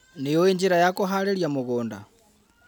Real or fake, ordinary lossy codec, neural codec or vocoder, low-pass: real; none; none; none